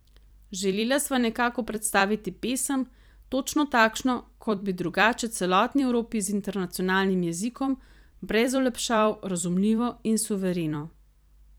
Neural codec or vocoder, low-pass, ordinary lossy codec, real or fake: vocoder, 44.1 kHz, 128 mel bands every 256 samples, BigVGAN v2; none; none; fake